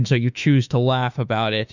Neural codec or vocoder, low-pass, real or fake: autoencoder, 48 kHz, 32 numbers a frame, DAC-VAE, trained on Japanese speech; 7.2 kHz; fake